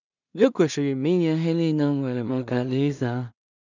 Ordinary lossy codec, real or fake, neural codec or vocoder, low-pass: none; fake; codec, 16 kHz in and 24 kHz out, 0.4 kbps, LongCat-Audio-Codec, two codebook decoder; 7.2 kHz